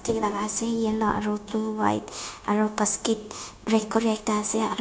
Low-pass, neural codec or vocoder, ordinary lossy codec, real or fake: none; codec, 16 kHz, 0.9 kbps, LongCat-Audio-Codec; none; fake